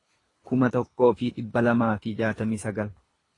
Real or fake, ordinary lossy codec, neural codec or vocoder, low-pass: fake; AAC, 32 kbps; codec, 24 kHz, 3 kbps, HILCodec; 10.8 kHz